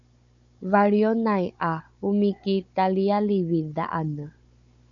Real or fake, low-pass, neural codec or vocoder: fake; 7.2 kHz; codec, 16 kHz, 16 kbps, FunCodec, trained on Chinese and English, 50 frames a second